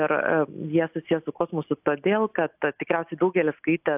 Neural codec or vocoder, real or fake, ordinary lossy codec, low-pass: none; real; AAC, 32 kbps; 3.6 kHz